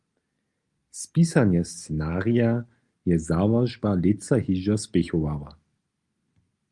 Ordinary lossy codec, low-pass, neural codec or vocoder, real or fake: Opus, 32 kbps; 10.8 kHz; none; real